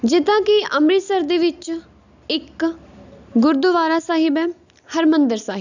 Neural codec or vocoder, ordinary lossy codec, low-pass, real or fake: none; none; 7.2 kHz; real